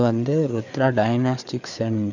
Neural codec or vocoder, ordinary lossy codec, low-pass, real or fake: codec, 16 kHz, 4 kbps, FreqCodec, larger model; none; 7.2 kHz; fake